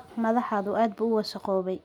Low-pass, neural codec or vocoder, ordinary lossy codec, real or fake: 19.8 kHz; none; none; real